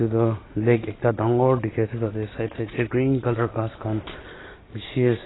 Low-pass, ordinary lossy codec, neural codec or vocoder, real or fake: 7.2 kHz; AAC, 16 kbps; none; real